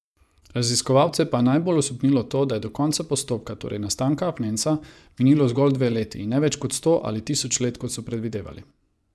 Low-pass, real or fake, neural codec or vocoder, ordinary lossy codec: none; fake; vocoder, 24 kHz, 100 mel bands, Vocos; none